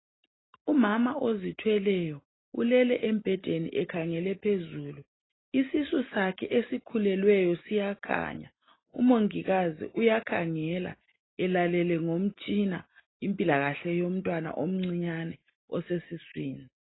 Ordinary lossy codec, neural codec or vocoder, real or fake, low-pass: AAC, 16 kbps; none; real; 7.2 kHz